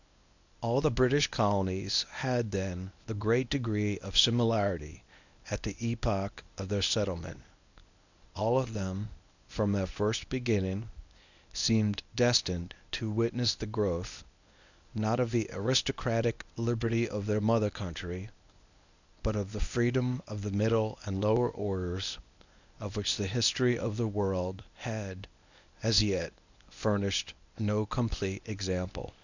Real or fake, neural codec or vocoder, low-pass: fake; codec, 24 kHz, 0.9 kbps, WavTokenizer, medium speech release version 1; 7.2 kHz